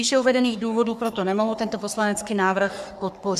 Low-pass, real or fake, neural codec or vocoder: 14.4 kHz; fake; codec, 44.1 kHz, 3.4 kbps, Pupu-Codec